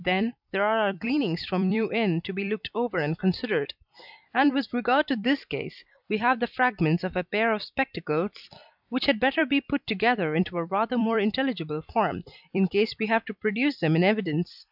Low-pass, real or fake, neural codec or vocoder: 5.4 kHz; fake; vocoder, 44.1 kHz, 128 mel bands every 256 samples, BigVGAN v2